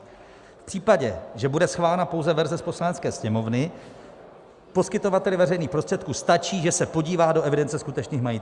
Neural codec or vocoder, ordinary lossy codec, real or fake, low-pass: none; Opus, 64 kbps; real; 10.8 kHz